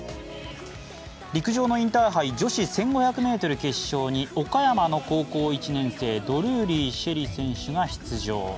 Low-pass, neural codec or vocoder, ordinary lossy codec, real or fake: none; none; none; real